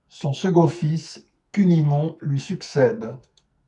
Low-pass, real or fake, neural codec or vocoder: 10.8 kHz; fake; codec, 44.1 kHz, 2.6 kbps, SNAC